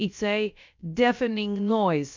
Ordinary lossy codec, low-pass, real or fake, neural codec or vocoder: MP3, 64 kbps; 7.2 kHz; fake; codec, 16 kHz, about 1 kbps, DyCAST, with the encoder's durations